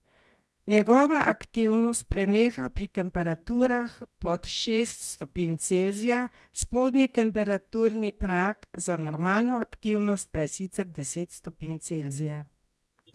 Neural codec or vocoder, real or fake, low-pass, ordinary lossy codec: codec, 24 kHz, 0.9 kbps, WavTokenizer, medium music audio release; fake; none; none